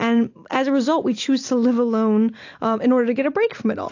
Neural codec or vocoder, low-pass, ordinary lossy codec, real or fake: none; 7.2 kHz; AAC, 48 kbps; real